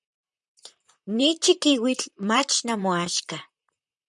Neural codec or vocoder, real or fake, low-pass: vocoder, 44.1 kHz, 128 mel bands, Pupu-Vocoder; fake; 10.8 kHz